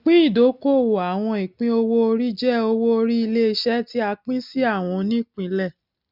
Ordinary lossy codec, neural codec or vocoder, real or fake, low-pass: none; none; real; 5.4 kHz